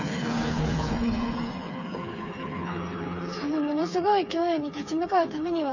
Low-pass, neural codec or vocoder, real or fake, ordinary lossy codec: 7.2 kHz; codec, 16 kHz, 4 kbps, FreqCodec, smaller model; fake; none